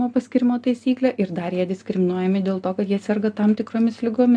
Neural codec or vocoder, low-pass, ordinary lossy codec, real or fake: none; 9.9 kHz; AAC, 64 kbps; real